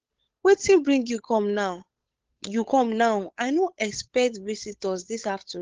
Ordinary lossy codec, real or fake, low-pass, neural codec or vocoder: Opus, 16 kbps; fake; 7.2 kHz; codec, 16 kHz, 8 kbps, FunCodec, trained on Chinese and English, 25 frames a second